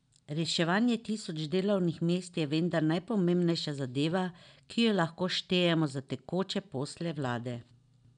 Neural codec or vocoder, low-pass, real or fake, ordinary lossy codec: none; 9.9 kHz; real; none